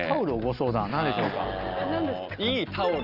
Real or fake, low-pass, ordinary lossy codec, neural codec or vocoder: real; 5.4 kHz; Opus, 24 kbps; none